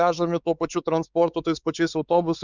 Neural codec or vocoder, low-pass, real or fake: codec, 16 kHz, 4 kbps, X-Codec, WavLM features, trained on Multilingual LibriSpeech; 7.2 kHz; fake